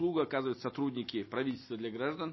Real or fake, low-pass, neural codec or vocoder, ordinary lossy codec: real; 7.2 kHz; none; MP3, 24 kbps